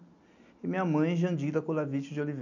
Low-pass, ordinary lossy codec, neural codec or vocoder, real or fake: 7.2 kHz; none; none; real